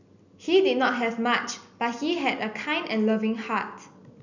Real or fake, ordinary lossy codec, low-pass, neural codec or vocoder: real; none; 7.2 kHz; none